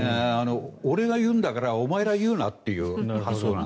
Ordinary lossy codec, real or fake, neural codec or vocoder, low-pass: none; real; none; none